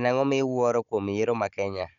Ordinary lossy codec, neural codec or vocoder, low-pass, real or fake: none; codec, 16 kHz, 16 kbps, FunCodec, trained on Chinese and English, 50 frames a second; 7.2 kHz; fake